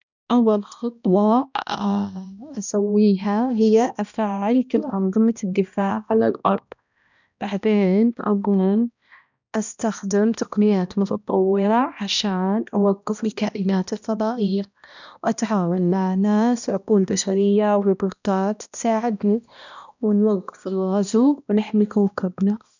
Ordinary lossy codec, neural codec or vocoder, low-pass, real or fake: none; codec, 16 kHz, 1 kbps, X-Codec, HuBERT features, trained on balanced general audio; 7.2 kHz; fake